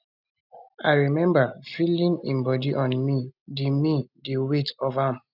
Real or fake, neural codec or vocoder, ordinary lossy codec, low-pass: real; none; none; 5.4 kHz